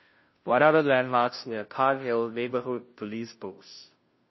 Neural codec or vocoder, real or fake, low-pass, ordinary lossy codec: codec, 16 kHz, 0.5 kbps, FunCodec, trained on Chinese and English, 25 frames a second; fake; 7.2 kHz; MP3, 24 kbps